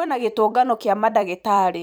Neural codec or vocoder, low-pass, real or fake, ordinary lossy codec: vocoder, 44.1 kHz, 128 mel bands every 256 samples, BigVGAN v2; none; fake; none